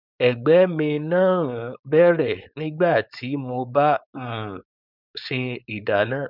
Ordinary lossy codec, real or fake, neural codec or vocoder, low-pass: none; fake; codec, 16 kHz, 4.8 kbps, FACodec; 5.4 kHz